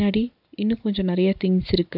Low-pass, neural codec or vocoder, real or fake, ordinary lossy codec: 5.4 kHz; none; real; none